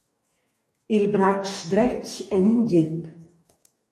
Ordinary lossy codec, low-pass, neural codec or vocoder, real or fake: AAC, 64 kbps; 14.4 kHz; codec, 44.1 kHz, 2.6 kbps, DAC; fake